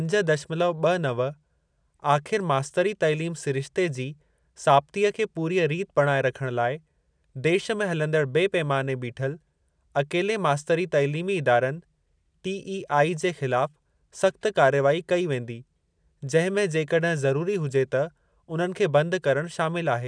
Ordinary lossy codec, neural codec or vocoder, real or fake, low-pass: none; none; real; 9.9 kHz